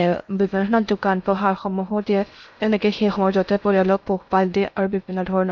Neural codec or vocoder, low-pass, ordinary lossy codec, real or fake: codec, 16 kHz in and 24 kHz out, 0.6 kbps, FocalCodec, streaming, 4096 codes; 7.2 kHz; none; fake